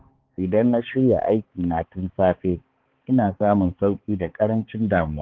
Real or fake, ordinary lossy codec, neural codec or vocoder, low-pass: fake; Opus, 16 kbps; codec, 16 kHz, 4 kbps, X-Codec, HuBERT features, trained on balanced general audio; 7.2 kHz